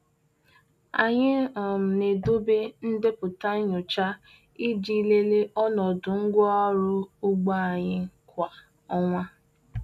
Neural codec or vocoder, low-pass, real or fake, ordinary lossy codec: none; 14.4 kHz; real; none